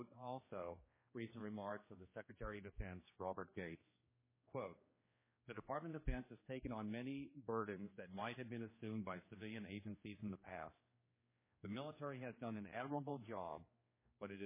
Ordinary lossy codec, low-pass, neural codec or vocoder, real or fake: MP3, 16 kbps; 3.6 kHz; codec, 16 kHz, 2 kbps, X-Codec, HuBERT features, trained on general audio; fake